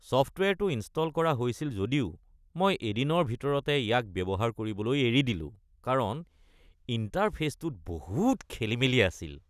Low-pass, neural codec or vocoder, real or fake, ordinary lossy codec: 14.4 kHz; none; real; none